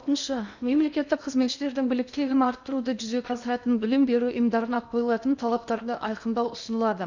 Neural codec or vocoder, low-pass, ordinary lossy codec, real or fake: codec, 16 kHz in and 24 kHz out, 0.8 kbps, FocalCodec, streaming, 65536 codes; 7.2 kHz; none; fake